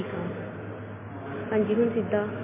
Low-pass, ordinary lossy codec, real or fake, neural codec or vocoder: 3.6 kHz; MP3, 16 kbps; real; none